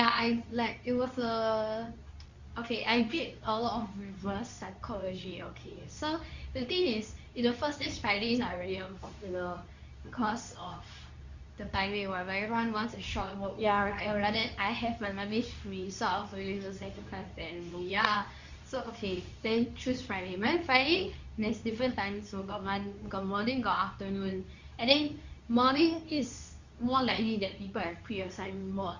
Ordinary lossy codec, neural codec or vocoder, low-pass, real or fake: Opus, 64 kbps; codec, 24 kHz, 0.9 kbps, WavTokenizer, medium speech release version 2; 7.2 kHz; fake